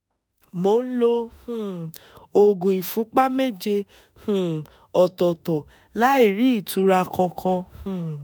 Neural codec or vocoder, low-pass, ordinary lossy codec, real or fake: autoencoder, 48 kHz, 32 numbers a frame, DAC-VAE, trained on Japanese speech; none; none; fake